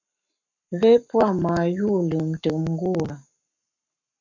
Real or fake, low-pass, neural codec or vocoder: fake; 7.2 kHz; codec, 44.1 kHz, 7.8 kbps, Pupu-Codec